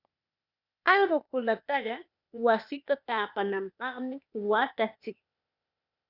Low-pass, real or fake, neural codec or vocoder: 5.4 kHz; fake; codec, 16 kHz, 0.8 kbps, ZipCodec